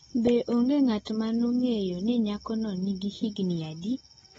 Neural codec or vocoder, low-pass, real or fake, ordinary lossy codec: none; 14.4 kHz; real; AAC, 24 kbps